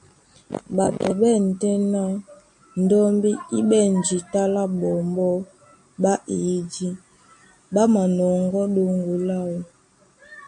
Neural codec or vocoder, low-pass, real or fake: none; 9.9 kHz; real